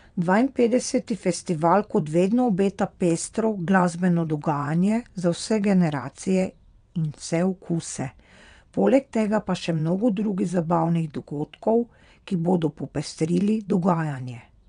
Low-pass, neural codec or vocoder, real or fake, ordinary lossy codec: 9.9 kHz; vocoder, 22.05 kHz, 80 mel bands, WaveNeXt; fake; MP3, 96 kbps